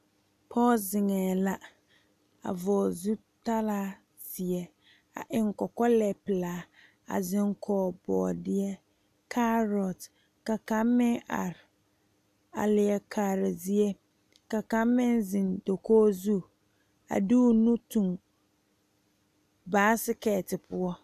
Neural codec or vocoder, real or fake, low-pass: none; real; 14.4 kHz